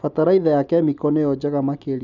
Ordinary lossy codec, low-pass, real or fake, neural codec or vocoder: none; 7.2 kHz; real; none